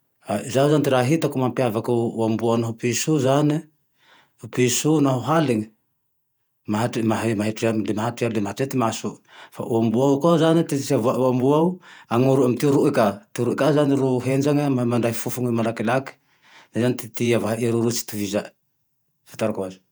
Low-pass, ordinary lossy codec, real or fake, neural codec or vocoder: none; none; fake; vocoder, 48 kHz, 128 mel bands, Vocos